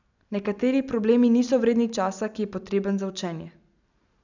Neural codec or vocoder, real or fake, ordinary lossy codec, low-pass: none; real; none; 7.2 kHz